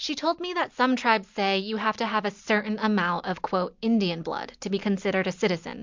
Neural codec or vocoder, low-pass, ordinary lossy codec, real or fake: none; 7.2 kHz; MP3, 64 kbps; real